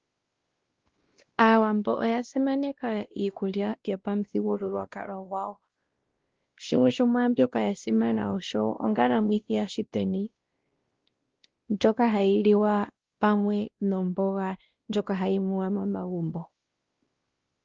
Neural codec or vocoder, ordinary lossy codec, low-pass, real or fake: codec, 16 kHz, 1 kbps, X-Codec, WavLM features, trained on Multilingual LibriSpeech; Opus, 16 kbps; 7.2 kHz; fake